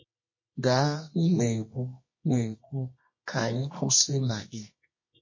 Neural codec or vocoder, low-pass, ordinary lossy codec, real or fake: codec, 24 kHz, 0.9 kbps, WavTokenizer, medium music audio release; 7.2 kHz; MP3, 32 kbps; fake